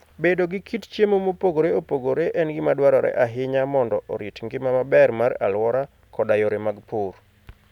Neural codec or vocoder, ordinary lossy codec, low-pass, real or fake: none; none; 19.8 kHz; real